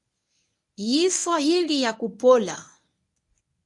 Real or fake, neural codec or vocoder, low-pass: fake; codec, 24 kHz, 0.9 kbps, WavTokenizer, medium speech release version 1; 10.8 kHz